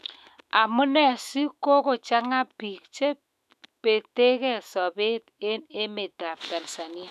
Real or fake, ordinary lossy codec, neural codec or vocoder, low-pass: fake; none; autoencoder, 48 kHz, 128 numbers a frame, DAC-VAE, trained on Japanese speech; 14.4 kHz